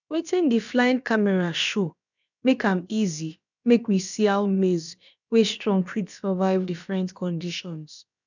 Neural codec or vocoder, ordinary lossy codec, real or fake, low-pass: codec, 16 kHz, about 1 kbps, DyCAST, with the encoder's durations; none; fake; 7.2 kHz